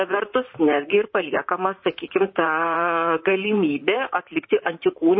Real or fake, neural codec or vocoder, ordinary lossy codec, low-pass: fake; vocoder, 44.1 kHz, 128 mel bands, Pupu-Vocoder; MP3, 24 kbps; 7.2 kHz